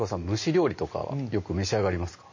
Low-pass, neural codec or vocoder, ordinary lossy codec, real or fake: 7.2 kHz; none; MP3, 32 kbps; real